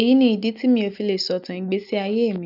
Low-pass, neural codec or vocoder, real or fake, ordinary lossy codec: 5.4 kHz; none; real; none